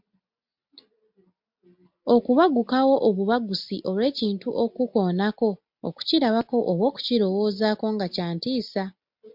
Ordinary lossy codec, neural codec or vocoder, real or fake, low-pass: MP3, 48 kbps; none; real; 5.4 kHz